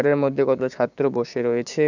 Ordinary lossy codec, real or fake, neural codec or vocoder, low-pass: none; fake; codec, 16 kHz, 6 kbps, DAC; 7.2 kHz